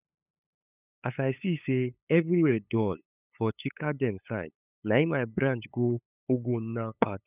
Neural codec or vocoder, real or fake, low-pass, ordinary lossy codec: codec, 16 kHz, 8 kbps, FunCodec, trained on LibriTTS, 25 frames a second; fake; 3.6 kHz; none